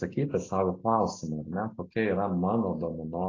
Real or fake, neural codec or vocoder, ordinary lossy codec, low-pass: real; none; AAC, 32 kbps; 7.2 kHz